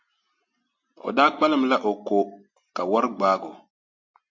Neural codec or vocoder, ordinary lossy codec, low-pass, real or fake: none; MP3, 48 kbps; 7.2 kHz; real